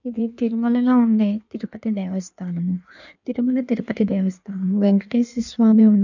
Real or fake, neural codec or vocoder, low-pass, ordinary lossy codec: fake; codec, 16 kHz in and 24 kHz out, 1.1 kbps, FireRedTTS-2 codec; 7.2 kHz; none